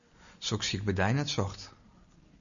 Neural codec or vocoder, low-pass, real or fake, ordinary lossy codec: none; 7.2 kHz; real; MP3, 96 kbps